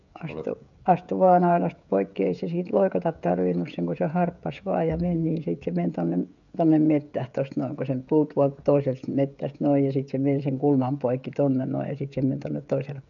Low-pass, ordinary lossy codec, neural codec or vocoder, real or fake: 7.2 kHz; none; codec, 16 kHz, 16 kbps, FreqCodec, smaller model; fake